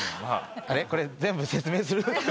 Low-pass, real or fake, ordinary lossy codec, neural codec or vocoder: none; real; none; none